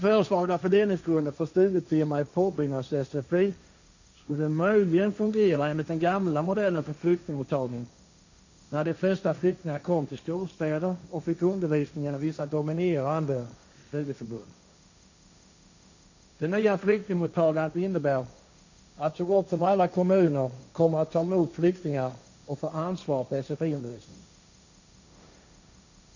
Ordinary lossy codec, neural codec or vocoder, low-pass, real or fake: none; codec, 16 kHz, 1.1 kbps, Voila-Tokenizer; 7.2 kHz; fake